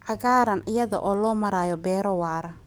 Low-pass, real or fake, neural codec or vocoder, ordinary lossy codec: none; fake; codec, 44.1 kHz, 7.8 kbps, DAC; none